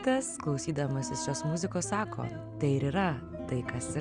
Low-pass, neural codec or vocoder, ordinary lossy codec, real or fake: 9.9 kHz; none; Opus, 64 kbps; real